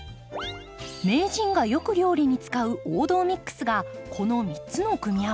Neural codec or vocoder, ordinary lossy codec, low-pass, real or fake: none; none; none; real